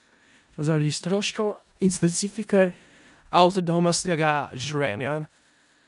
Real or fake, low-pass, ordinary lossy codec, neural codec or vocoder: fake; 10.8 kHz; none; codec, 16 kHz in and 24 kHz out, 0.4 kbps, LongCat-Audio-Codec, four codebook decoder